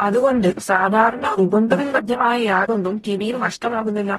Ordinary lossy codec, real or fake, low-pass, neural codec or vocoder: AAC, 32 kbps; fake; 19.8 kHz; codec, 44.1 kHz, 0.9 kbps, DAC